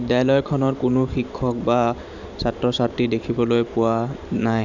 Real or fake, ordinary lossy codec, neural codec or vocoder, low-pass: fake; none; vocoder, 44.1 kHz, 128 mel bands every 512 samples, BigVGAN v2; 7.2 kHz